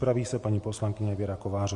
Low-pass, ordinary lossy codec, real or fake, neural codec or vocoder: 10.8 kHz; MP3, 48 kbps; real; none